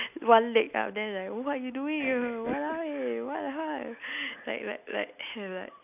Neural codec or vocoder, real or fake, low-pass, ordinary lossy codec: none; real; 3.6 kHz; none